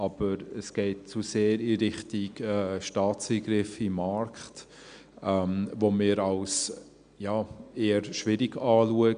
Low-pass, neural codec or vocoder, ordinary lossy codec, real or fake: 9.9 kHz; none; none; real